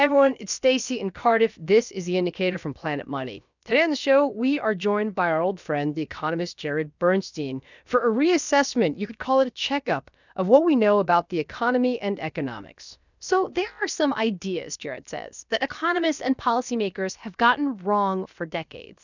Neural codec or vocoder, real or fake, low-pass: codec, 16 kHz, about 1 kbps, DyCAST, with the encoder's durations; fake; 7.2 kHz